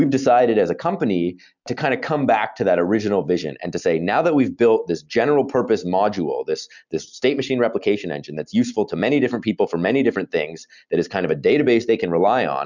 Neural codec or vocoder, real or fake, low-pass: none; real; 7.2 kHz